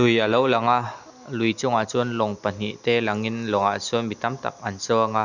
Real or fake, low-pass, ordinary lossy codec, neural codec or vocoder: real; 7.2 kHz; none; none